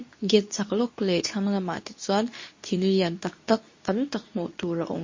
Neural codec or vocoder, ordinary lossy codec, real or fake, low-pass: codec, 24 kHz, 0.9 kbps, WavTokenizer, medium speech release version 2; MP3, 32 kbps; fake; 7.2 kHz